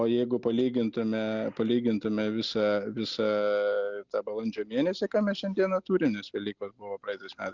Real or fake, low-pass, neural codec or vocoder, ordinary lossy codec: real; 7.2 kHz; none; Opus, 64 kbps